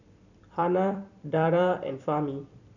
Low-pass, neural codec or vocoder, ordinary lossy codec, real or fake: 7.2 kHz; vocoder, 44.1 kHz, 128 mel bands every 256 samples, BigVGAN v2; none; fake